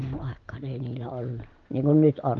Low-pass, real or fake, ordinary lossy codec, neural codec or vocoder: 7.2 kHz; real; Opus, 32 kbps; none